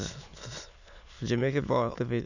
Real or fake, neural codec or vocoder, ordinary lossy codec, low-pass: fake; autoencoder, 22.05 kHz, a latent of 192 numbers a frame, VITS, trained on many speakers; none; 7.2 kHz